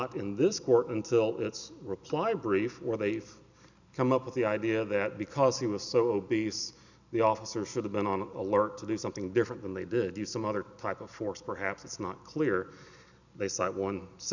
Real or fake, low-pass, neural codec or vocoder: real; 7.2 kHz; none